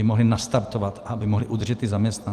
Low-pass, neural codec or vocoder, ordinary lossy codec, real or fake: 14.4 kHz; vocoder, 44.1 kHz, 128 mel bands every 256 samples, BigVGAN v2; Opus, 32 kbps; fake